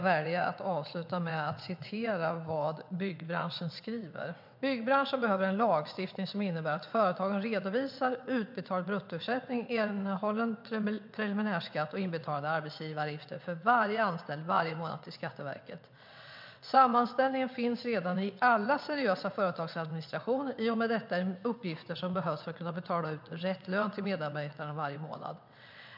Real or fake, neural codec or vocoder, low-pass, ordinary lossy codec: fake; vocoder, 22.05 kHz, 80 mel bands, WaveNeXt; 5.4 kHz; MP3, 48 kbps